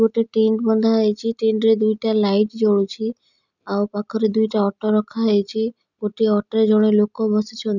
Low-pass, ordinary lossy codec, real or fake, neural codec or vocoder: 7.2 kHz; none; real; none